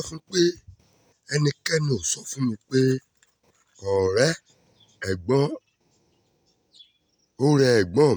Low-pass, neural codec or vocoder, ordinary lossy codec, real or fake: none; none; none; real